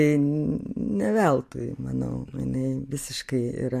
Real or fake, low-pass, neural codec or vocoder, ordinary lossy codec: real; 14.4 kHz; none; MP3, 64 kbps